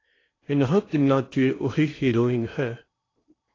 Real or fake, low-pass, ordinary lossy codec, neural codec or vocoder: fake; 7.2 kHz; AAC, 32 kbps; codec, 16 kHz in and 24 kHz out, 0.8 kbps, FocalCodec, streaming, 65536 codes